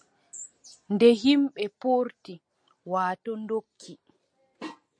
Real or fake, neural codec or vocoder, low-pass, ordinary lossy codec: real; none; 9.9 kHz; MP3, 48 kbps